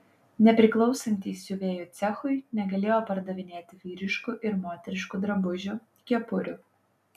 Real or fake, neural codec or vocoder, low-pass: real; none; 14.4 kHz